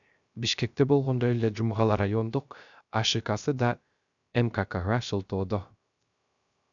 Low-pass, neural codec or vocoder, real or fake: 7.2 kHz; codec, 16 kHz, 0.3 kbps, FocalCodec; fake